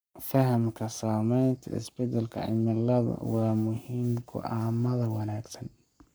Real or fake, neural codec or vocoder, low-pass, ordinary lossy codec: fake; codec, 44.1 kHz, 7.8 kbps, Pupu-Codec; none; none